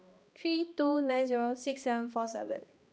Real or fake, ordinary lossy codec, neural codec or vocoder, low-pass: fake; none; codec, 16 kHz, 1 kbps, X-Codec, HuBERT features, trained on balanced general audio; none